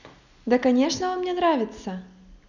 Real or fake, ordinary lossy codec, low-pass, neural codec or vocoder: real; none; 7.2 kHz; none